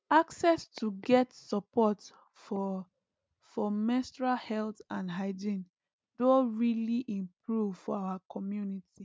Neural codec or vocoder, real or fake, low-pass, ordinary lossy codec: none; real; none; none